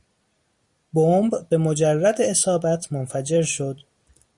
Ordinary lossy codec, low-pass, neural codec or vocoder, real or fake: Opus, 64 kbps; 10.8 kHz; vocoder, 44.1 kHz, 128 mel bands every 256 samples, BigVGAN v2; fake